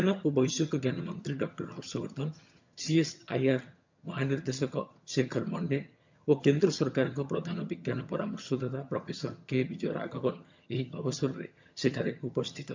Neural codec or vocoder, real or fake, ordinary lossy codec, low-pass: vocoder, 22.05 kHz, 80 mel bands, HiFi-GAN; fake; MP3, 64 kbps; 7.2 kHz